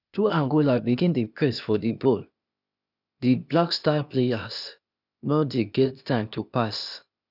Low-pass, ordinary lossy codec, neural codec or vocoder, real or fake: 5.4 kHz; none; codec, 16 kHz, 0.8 kbps, ZipCodec; fake